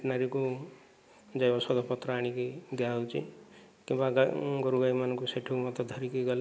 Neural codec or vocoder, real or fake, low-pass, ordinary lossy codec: none; real; none; none